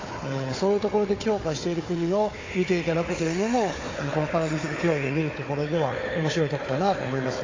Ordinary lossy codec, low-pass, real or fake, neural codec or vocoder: AAC, 32 kbps; 7.2 kHz; fake; codec, 16 kHz, 4 kbps, FunCodec, trained on Chinese and English, 50 frames a second